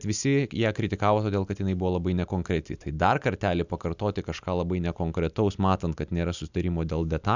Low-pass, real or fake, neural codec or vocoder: 7.2 kHz; real; none